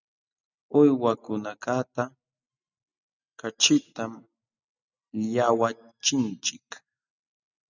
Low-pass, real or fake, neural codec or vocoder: 7.2 kHz; real; none